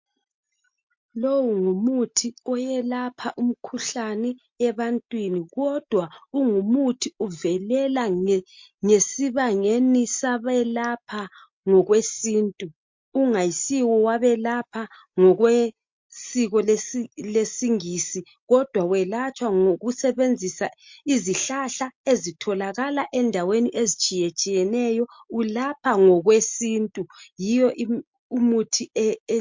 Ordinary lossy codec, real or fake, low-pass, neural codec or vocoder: MP3, 48 kbps; real; 7.2 kHz; none